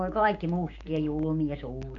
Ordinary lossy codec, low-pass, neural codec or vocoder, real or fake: AAC, 64 kbps; 7.2 kHz; codec, 16 kHz, 6 kbps, DAC; fake